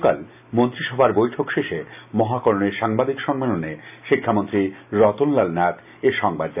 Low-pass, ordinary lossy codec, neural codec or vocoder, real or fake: 3.6 kHz; none; none; real